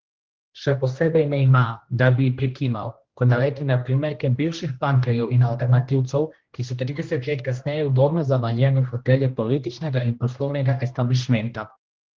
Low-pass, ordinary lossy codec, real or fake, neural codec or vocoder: 7.2 kHz; Opus, 16 kbps; fake; codec, 16 kHz, 1 kbps, X-Codec, HuBERT features, trained on general audio